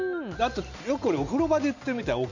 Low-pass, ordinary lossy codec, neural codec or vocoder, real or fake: 7.2 kHz; none; none; real